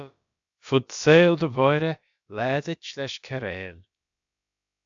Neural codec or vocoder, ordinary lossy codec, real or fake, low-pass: codec, 16 kHz, about 1 kbps, DyCAST, with the encoder's durations; AAC, 64 kbps; fake; 7.2 kHz